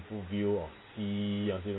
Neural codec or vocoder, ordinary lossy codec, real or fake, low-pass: none; AAC, 16 kbps; real; 7.2 kHz